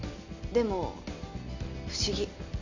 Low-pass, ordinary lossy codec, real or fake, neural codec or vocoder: 7.2 kHz; none; real; none